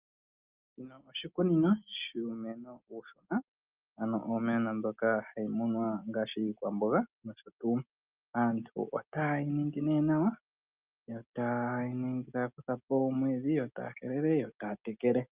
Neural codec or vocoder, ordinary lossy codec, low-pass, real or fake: none; Opus, 24 kbps; 3.6 kHz; real